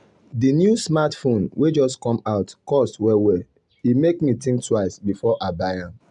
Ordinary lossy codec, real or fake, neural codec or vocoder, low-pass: none; real; none; 10.8 kHz